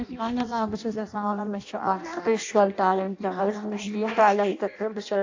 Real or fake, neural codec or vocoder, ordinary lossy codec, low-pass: fake; codec, 16 kHz in and 24 kHz out, 0.6 kbps, FireRedTTS-2 codec; AAC, 48 kbps; 7.2 kHz